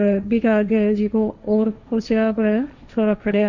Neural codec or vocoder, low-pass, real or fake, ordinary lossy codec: codec, 16 kHz, 1.1 kbps, Voila-Tokenizer; 7.2 kHz; fake; none